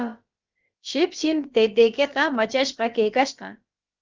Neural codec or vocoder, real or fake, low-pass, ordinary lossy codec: codec, 16 kHz, about 1 kbps, DyCAST, with the encoder's durations; fake; 7.2 kHz; Opus, 24 kbps